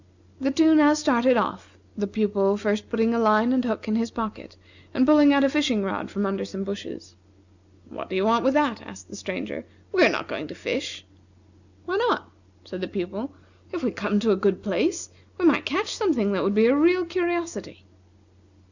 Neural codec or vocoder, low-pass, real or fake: none; 7.2 kHz; real